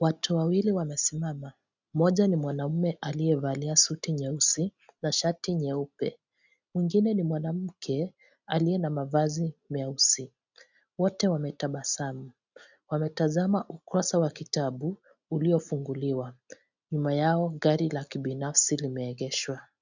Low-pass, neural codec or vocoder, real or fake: 7.2 kHz; none; real